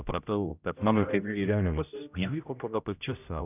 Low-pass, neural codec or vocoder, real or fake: 3.6 kHz; codec, 16 kHz, 0.5 kbps, X-Codec, HuBERT features, trained on general audio; fake